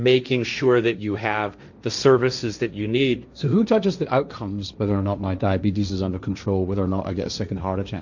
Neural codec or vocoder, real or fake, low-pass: codec, 16 kHz, 1.1 kbps, Voila-Tokenizer; fake; 7.2 kHz